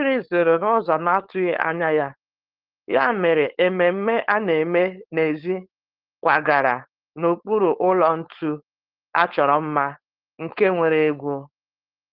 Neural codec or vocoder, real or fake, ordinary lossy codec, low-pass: codec, 16 kHz, 4.8 kbps, FACodec; fake; Opus, 16 kbps; 5.4 kHz